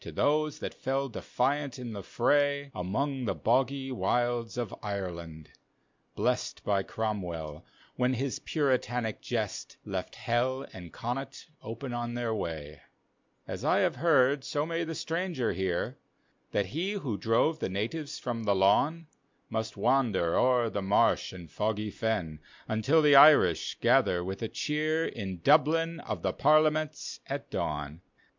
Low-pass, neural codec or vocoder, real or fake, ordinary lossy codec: 7.2 kHz; none; real; MP3, 64 kbps